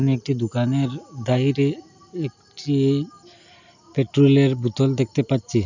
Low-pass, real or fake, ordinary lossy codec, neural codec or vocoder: 7.2 kHz; real; none; none